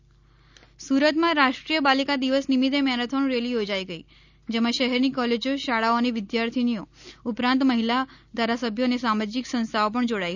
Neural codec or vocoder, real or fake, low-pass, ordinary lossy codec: none; real; 7.2 kHz; none